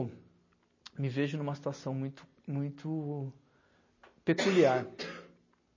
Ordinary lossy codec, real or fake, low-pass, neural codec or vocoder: MP3, 32 kbps; real; 7.2 kHz; none